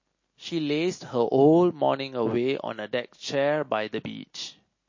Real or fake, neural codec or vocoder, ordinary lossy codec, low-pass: real; none; MP3, 32 kbps; 7.2 kHz